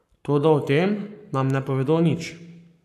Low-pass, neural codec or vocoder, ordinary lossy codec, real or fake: 14.4 kHz; codec, 44.1 kHz, 7.8 kbps, Pupu-Codec; none; fake